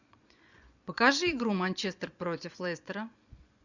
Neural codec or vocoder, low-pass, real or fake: vocoder, 24 kHz, 100 mel bands, Vocos; 7.2 kHz; fake